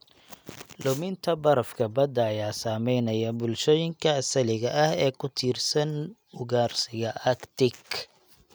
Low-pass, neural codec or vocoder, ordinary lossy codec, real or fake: none; none; none; real